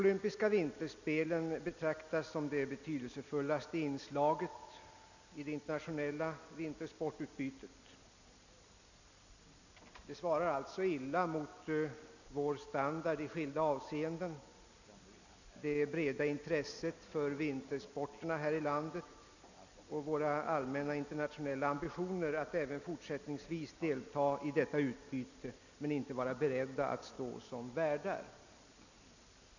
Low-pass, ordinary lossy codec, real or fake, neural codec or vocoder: 7.2 kHz; none; real; none